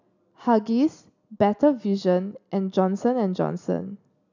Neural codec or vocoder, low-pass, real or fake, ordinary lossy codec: none; 7.2 kHz; real; none